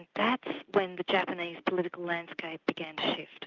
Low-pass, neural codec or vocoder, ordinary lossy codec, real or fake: 7.2 kHz; none; Opus, 24 kbps; real